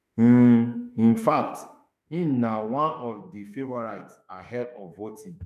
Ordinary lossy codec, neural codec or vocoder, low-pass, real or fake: none; autoencoder, 48 kHz, 32 numbers a frame, DAC-VAE, trained on Japanese speech; 14.4 kHz; fake